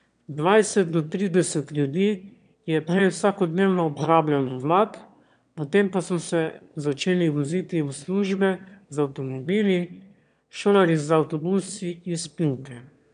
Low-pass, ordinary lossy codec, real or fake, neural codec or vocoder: 9.9 kHz; none; fake; autoencoder, 22.05 kHz, a latent of 192 numbers a frame, VITS, trained on one speaker